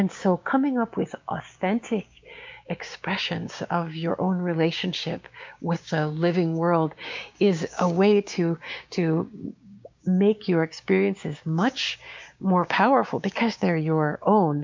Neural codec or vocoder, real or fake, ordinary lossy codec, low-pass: codec, 16 kHz, 6 kbps, DAC; fake; MP3, 64 kbps; 7.2 kHz